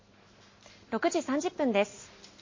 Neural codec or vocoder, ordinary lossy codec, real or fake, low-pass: none; MP3, 32 kbps; real; 7.2 kHz